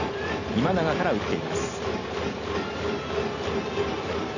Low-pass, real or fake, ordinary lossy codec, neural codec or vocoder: 7.2 kHz; real; none; none